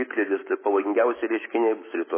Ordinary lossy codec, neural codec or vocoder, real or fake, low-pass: MP3, 16 kbps; autoencoder, 48 kHz, 128 numbers a frame, DAC-VAE, trained on Japanese speech; fake; 3.6 kHz